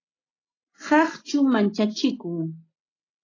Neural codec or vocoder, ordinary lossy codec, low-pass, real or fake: none; AAC, 32 kbps; 7.2 kHz; real